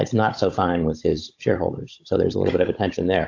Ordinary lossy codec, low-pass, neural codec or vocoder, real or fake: AAC, 48 kbps; 7.2 kHz; codec, 16 kHz, 16 kbps, FunCodec, trained on Chinese and English, 50 frames a second; fake